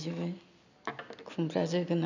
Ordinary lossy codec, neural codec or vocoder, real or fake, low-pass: none; none; real; 7.2 kHz